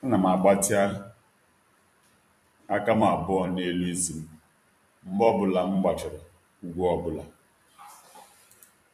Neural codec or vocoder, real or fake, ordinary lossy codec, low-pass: vocoder, 44.1 kHz, 128 mel bands every 256 samples, BigVGAN v2; fake; MP3, 64 kbps; 14.4 kHz